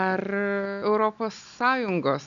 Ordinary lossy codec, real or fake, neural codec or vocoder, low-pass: MP3, 96 kbps; real; none; 7.2 kHz